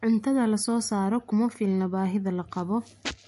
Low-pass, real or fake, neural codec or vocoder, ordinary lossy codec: 14.4 kHz; real; none; MP3, 48 kbps